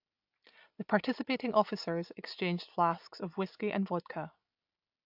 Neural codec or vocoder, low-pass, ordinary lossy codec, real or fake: none; 5.4 kHz; AAC, 48 kbps; real